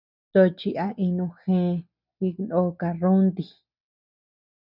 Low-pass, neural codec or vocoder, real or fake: 5.4 kHz; none; real